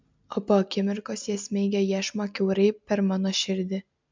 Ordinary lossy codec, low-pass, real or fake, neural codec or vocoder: MP3, 64 kbps; 7.2 kHz; real; none